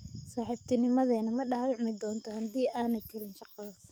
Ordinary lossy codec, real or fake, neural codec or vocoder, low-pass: none; fake; codec, 44.1 kHz, 7.8 kbps, DAC; none